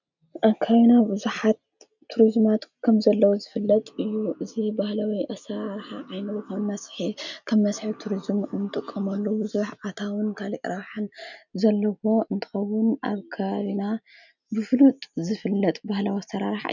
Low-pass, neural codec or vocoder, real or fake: 7.2 kHz; none; real